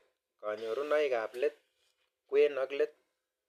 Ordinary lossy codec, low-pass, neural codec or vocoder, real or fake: none; 14.4 kHz; none; real